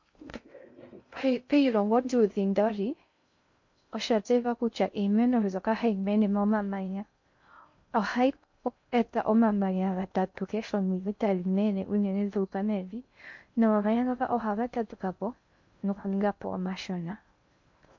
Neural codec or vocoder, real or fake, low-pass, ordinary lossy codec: codec, 16 kHz in and 24 kHz out, 0.6 kbps, FocalCodec, streaming, 2048 codes; fake; 7.2 kHz; MP3, 48 kbps